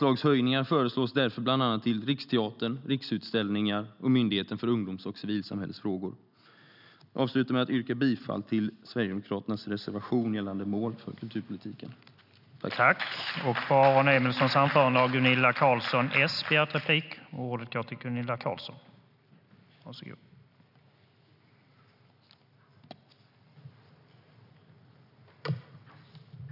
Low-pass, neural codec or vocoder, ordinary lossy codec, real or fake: 5.4 kHz; none; none; real